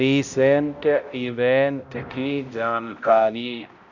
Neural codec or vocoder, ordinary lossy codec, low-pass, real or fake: codec, 16 kHz, 0.5 kbps, X-Codec, HuBERT features, trained on balanced general audio; none; 7.2 kHz; fake